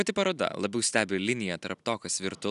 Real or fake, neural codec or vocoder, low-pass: real; none; 10.8 kHz